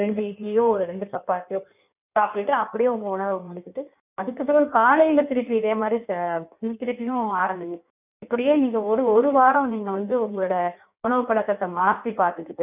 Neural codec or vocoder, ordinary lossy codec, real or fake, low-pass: codec, 16 kHz in and 24 kHz out, 1.1 kbps, FireRedTTS-2 codec; none; fake; 3.6 kHz